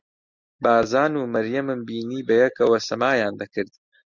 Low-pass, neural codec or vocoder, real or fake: 7.2 kHz; none; real